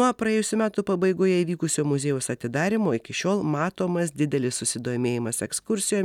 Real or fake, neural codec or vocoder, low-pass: real; none; 19.8 kHz